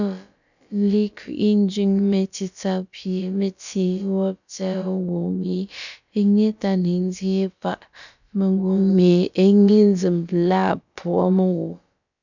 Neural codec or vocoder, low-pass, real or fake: codec, 16 kHz, about 1 kbps, DyCAST, with the encoder's durations; 7.2 kHz; fake